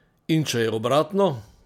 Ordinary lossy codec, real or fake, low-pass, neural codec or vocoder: MP3, 96 kbps; real; 19.8 kHz; none